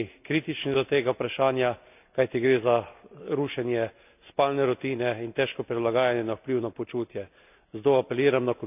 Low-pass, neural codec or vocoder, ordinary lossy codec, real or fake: 3.6 kHz; none; none; real